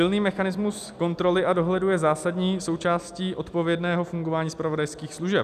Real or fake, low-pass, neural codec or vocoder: real; 14.4 kHz; none